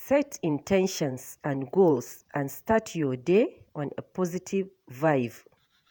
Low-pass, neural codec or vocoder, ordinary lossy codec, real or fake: none; vocoder, 48 kHz, 128 mel bands, Vocos; none; fake